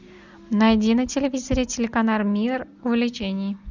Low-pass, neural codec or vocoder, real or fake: 7.2 kHz; none; real